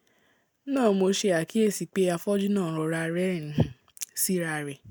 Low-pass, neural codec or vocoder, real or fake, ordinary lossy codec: none; none; real; none